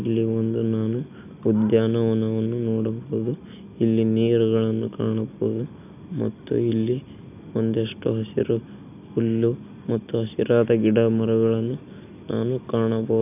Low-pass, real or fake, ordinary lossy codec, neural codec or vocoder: 3.6 kHz; real; none; none